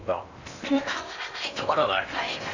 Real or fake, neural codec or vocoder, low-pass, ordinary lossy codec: fake; codec, 16 kHz in and 24 kHz out, 0.8 kbps, FocalCodec, streaming, 65536 codes; 7.2 kHz; none